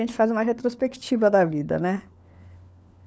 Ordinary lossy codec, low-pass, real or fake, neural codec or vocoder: none; none; fake; codec, 16 kHz, 4 kbps, FunCodec, trained on LibriTTS, 50 frames a second